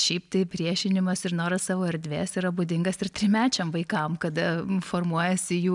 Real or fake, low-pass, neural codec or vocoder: real; 10.8 kHz; none